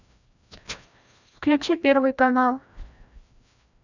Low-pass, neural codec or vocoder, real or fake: 7.2 kHz; codec, 16 kHz, 1 kbps, FreqCodec, larger model; fake